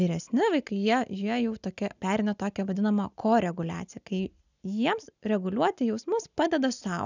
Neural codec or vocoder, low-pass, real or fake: none; 7.2 kHz; real